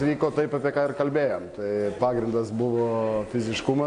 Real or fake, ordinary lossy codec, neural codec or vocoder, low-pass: real; AAC, 48 kbps; none; 9.9 kHz